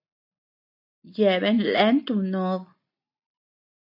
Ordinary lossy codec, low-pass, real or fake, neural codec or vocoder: AAC, 48 kbps; 5.4 kHz; real; none